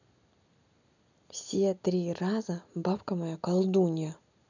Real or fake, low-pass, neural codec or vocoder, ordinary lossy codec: real; 7.2 kHz; none; none